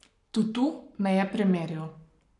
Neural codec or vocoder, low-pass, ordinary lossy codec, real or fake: codec, 44.1 kHz, 7.8 kbps, Pupu-Codec; 10.8 kHz; none; fake